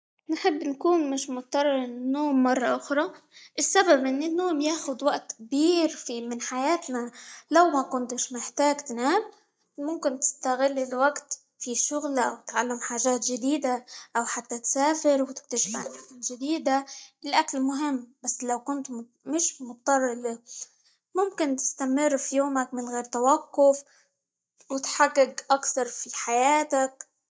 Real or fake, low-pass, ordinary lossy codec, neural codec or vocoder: real; none; none; none